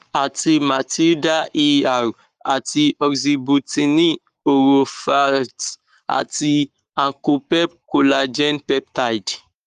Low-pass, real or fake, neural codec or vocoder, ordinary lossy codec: 14.4 kHz; fake; codec, 44.1 kHz, 7.8 kbps, Pupu-Codec; Opus, 32 kbps